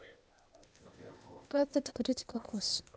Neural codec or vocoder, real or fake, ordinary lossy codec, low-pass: codec, 16 kHz, 0.8 kbps, ZipCodec; fake; none; none